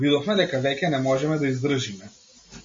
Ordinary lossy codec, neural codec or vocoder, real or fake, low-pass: MP3, 32 kbps; none; real; 7.2 kHz